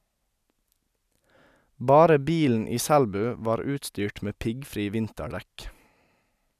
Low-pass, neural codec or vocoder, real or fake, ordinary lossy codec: 14.4 kHz; none; real; none